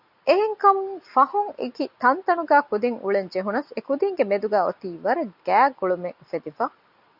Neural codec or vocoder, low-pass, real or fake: none; 5.4 kHz; real